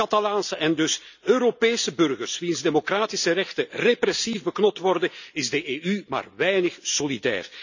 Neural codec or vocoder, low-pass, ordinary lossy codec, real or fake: none; 7.2 kHz; none; real